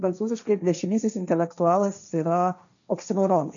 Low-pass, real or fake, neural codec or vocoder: 7.2 kHz; fake; codec, 16 kHz, 1.1 kbps, Voila-Tokenizer